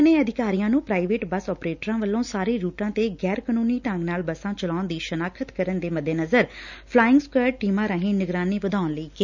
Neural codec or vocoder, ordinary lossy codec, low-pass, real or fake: none; none; 7.2 kHz; real